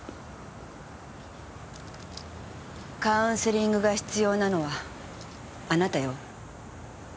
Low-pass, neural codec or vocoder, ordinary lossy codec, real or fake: none; none; none; real